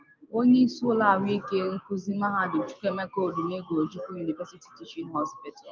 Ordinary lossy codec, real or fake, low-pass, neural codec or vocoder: Opus, 32 kbps; real; 7.2 kHz; none